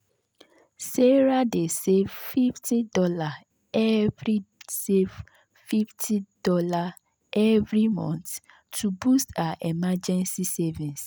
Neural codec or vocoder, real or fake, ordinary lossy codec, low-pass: none; real; none; none